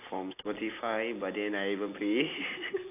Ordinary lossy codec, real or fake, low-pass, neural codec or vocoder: AAC, 32 kbps; real; 3.6 kHz; none